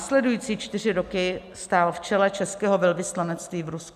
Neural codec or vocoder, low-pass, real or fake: none; 14.4 kHz; real